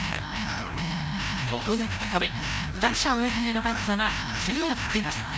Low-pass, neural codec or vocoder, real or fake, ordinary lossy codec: none; codec, 16 kHz, 0.5 kbps, FreqCodec, larger model; fake; none